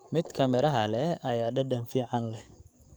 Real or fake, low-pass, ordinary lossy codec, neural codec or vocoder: fake; none; none; codec, 44.1 kHz, 7.8 kbps, DAC